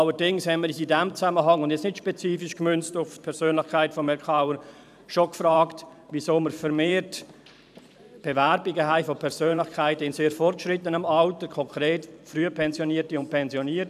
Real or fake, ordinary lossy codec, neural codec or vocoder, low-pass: fake; none; vocoder, 44.1 kHz, 128 mel bands every 512 samples, BigVGAN v2; 14.4 kHz